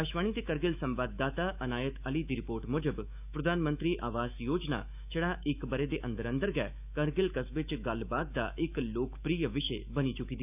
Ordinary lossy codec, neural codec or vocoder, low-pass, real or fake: none; autoencoder, 48 kHz, 128 numbers a frame, DAC-VAE, trained on Japanese speech; 3.6 kHz; fake